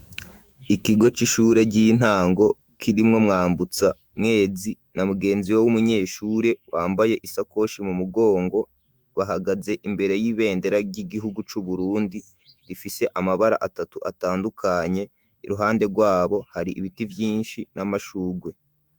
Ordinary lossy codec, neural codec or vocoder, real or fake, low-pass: Opus, 64 kbps; autoencoder, 48 kHz, 128 numbers a frame, DAC-VAE, trained on Japanese speech; fake; 19.8 kHz